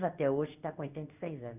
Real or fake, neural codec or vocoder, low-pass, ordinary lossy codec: fake; codec, 16 kHz in and 24 kHz out, 1 kbps, XY-Tokenizer; 3.6 kHz; none